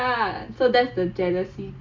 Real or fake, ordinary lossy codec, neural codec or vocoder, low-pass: real; AAC, 48 kbps; none; 7.2 kHz